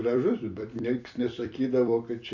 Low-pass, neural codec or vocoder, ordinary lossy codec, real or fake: 7.2 kHz; vocoder, 44.1 kHz, 128 mel bands every 256 samples, BigVGAN v2; Opus, 64 kbps; fake